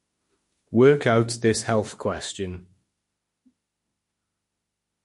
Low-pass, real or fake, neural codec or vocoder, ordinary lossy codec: 14.4 kHz; fake; autoencoder, 48 kHz, 32 numbers a frame, DAC-VAE, trained on Japanese speech; MP3, 48 kbps